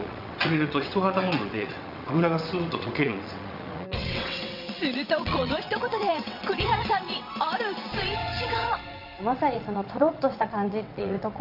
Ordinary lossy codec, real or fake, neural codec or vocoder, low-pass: none; fake; vocoder, 22.05 kHz, 80 mel bands, WaveNeXt; 5.4 kHz